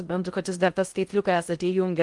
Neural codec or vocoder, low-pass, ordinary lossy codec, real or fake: codec, 16 kHz in and 24 kHz out, 0.6 kbps, FocalCodec, streaming, 2048 codes; 10.8 kHz; Opus, 32 kbps; fake